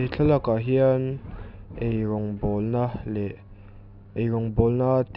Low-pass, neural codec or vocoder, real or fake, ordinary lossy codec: 5.4 kHz; none; real; none